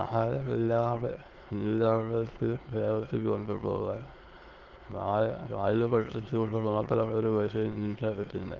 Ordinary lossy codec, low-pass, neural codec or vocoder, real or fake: Opus, 16 kbps; 7.2 kHz; autoencoder, 22.05 kHz, a latent of 192 numbers a frame, VITS, trained on many speakers; fake